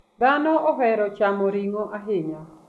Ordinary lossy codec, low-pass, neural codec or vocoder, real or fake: none; none; none; real